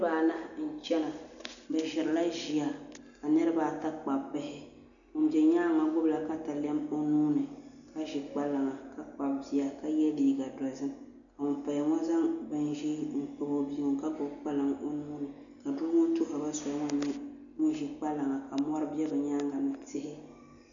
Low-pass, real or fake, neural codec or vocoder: 7.2 kHz; real; none